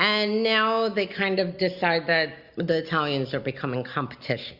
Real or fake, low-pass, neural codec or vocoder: real; 5.4 kHz; none